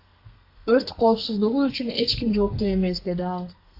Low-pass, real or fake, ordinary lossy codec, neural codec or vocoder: 5.4 kHz; fake; AAC, 32 kbps; codec, 32 kHz, 1.9 kbps, SNAC